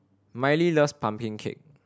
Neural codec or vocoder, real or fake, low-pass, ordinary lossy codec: none; real; none; none